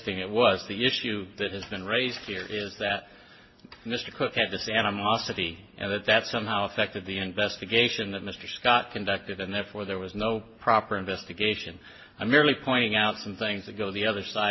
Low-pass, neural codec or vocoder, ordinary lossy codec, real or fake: 7.2 kHz; none; MP3, 24 kbps; real